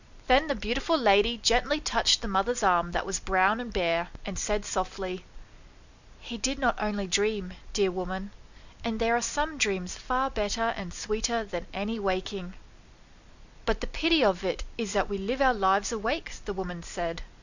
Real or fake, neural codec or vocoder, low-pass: real; none; 7.2 kHz